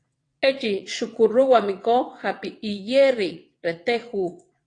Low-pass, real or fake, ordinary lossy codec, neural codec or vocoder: 9.9 kHz; fake; AAC, 48 kbps; vocoder, 22.05 kHz, 80 mel bands, WaveNeXt